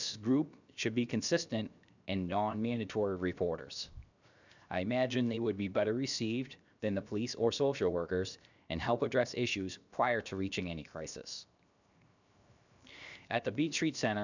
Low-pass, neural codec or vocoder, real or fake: 7.2 kHz; codec, 16 kHz, 0.7 kbps, FocalCodec; fake